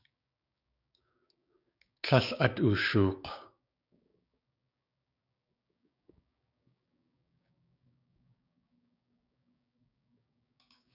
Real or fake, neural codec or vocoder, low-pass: fake; codec, 16 kHz, 6 kbps, DAC; 5.4 kHz